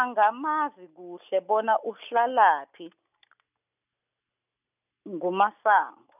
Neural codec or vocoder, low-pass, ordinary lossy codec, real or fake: none; 3.6 kHz; none; real